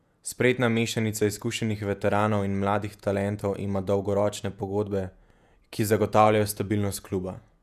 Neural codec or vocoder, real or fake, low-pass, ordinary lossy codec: vocoder, 48 kHz, 128 mel bands, Vocos; fake; 14.4 kHz; none